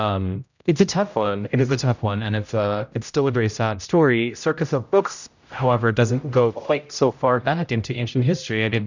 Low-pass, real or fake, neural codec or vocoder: 7.2 kHz; fake; codec, 16 kHz, 0.5 kbps, X-Codec, HuBERT features, trained on general audio